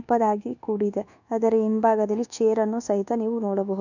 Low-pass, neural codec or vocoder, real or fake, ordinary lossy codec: 7.2 kHz; codec, 24 kHz, 1.2 kbps, DualCodec; fake; none